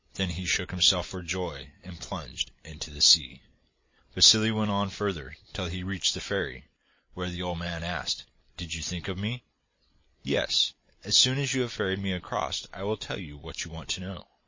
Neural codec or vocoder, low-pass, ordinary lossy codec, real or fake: none; 7.2 kHz; MP3, 32 kbps; real